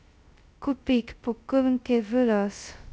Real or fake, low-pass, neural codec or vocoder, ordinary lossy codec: fake; none; codec, 16 kHz, 0.2 kbps, FocalCodec; none